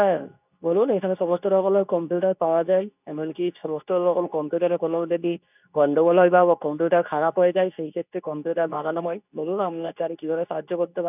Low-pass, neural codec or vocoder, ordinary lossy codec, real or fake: 3.6 kHz; codec, 24 kHz, 0.9 kbps, WavTokenizer, medium speech release version 2; none; fake